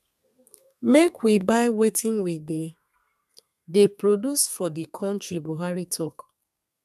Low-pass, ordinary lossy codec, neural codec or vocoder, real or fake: 14.4 kHz; none; codec, 32 kHz, 1.9 kbps, SNAC; fake